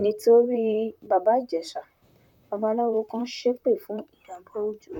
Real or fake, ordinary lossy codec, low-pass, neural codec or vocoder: fake; none; 19.8 kHz; vocoder, 44.1 kHz, 128 mel bands, Pupu-Vocoder